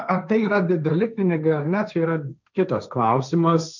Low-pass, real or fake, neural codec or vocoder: 7.2 kHz; fake; codec, 16 kHz, 1.1 kbps, Voila-Tokenizer